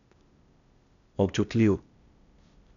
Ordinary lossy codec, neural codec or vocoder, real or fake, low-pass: none; codec, 16 kHz, 0.8 kbps, ZipCodec; fake; 7.2 kHz